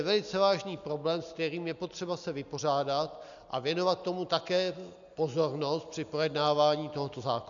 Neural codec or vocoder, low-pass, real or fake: none; 7.2 kHz; real